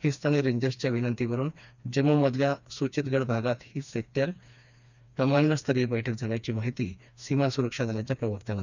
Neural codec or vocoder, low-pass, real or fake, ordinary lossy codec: codec, 16 kHz, 2 kbps, FreqCodec, smaller model; 7.2 kHz; fake; none